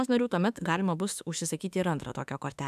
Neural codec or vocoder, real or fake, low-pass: autoencoder, 48 kHz, 32 numbers a frame, DAC-VAE, trained on Japanese speech; fake; 14.4 kHz